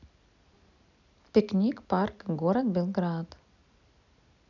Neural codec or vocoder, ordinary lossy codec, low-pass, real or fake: none; none; 7.2 kHz; real